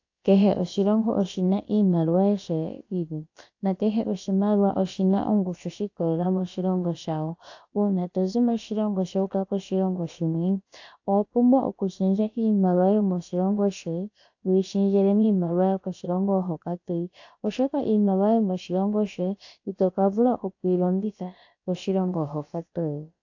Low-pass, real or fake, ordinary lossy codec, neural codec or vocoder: 7.2 kHz; fake; AAC, 48 kbps; codec, 16 kHz, about 1 kbps, DyCAST, with the encoder's durations